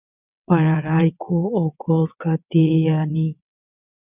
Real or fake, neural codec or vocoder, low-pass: fake; vocoder, 22.05 kHz, 80 mel bands, WaveNeXt; 3.6 kHz